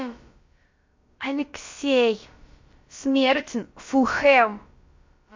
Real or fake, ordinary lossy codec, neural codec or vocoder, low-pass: fake; MP3, 48 kbps; codec, 16 kHz, about 1 kbps, DyCAST, with the encoder's durations; 7.2 kHz